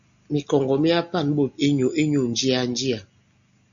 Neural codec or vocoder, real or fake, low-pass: none; real; 7.2 kHz